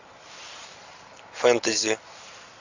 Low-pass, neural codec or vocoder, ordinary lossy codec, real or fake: 7.2 kHz; none; AAC, 48 kbps; real